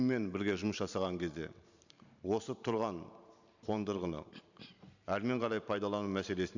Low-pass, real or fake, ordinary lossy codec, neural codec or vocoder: 7.2 kHz; fake; none; vocoder, 44.1 kHz, 128 mel bands every 512 samples, BigVGAN v2